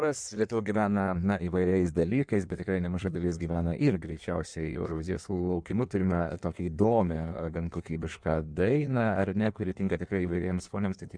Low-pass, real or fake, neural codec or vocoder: 9.9 kHz; fake; codec, 16 kHz in and 24 kHz out, 1.1 kbps, FireRedTTS-2 codec